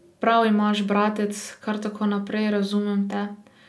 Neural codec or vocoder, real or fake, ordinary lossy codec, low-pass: none; real; none; none